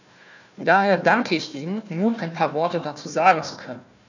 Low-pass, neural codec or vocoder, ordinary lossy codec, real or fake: 7.2 kHz; codec, 16 kHz, 1 kbps, FunCodec, trained on Chinese and English, 50 frames a second; none; fake